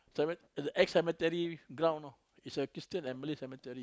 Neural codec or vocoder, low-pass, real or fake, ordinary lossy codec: none; none; real; none